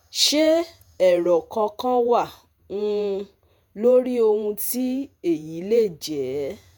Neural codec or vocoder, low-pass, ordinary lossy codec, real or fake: vocoder, 48 kHz, 128 mel bands, Vocos; none; none; fake